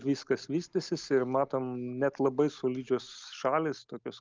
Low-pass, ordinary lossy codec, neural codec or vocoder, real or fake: 7.2 kHz; Opus, 24 kbps; none; real